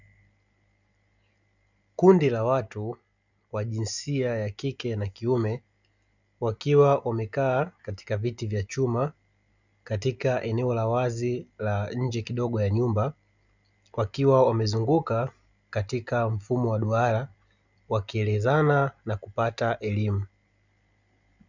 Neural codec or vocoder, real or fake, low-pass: none; real; 7.2 kHz